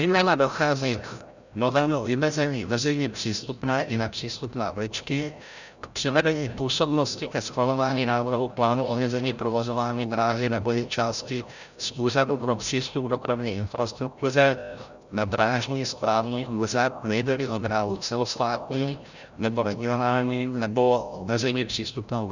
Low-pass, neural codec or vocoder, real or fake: 7.2 kHz; codec, 16 kHz, 0.5 kbps, FreqCodec, larger model; fake